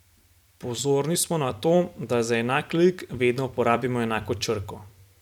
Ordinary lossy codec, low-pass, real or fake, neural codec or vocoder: none; 19.8 kHz; fake; vocoder, 44.1 kHz, 128 mel bands every 256 samples, BigVGAN v2